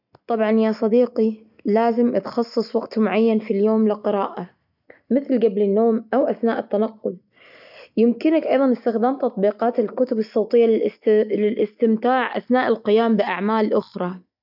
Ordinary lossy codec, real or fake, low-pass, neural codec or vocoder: none; real; 5.4 kHz; none